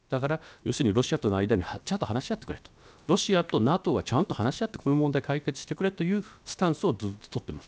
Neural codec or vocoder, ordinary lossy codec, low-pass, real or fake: codec, 16 kHz, about 1 kbps, DyCAST, with the encoder's durations; none; none; fake